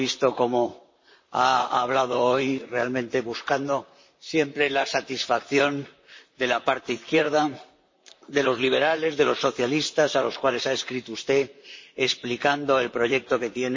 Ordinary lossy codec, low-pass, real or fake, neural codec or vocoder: MP3, 32 kbps; 7.2 kHz; fake; vocoder, 44.1 kHz, 128 mel bands, Pupu-Vocoder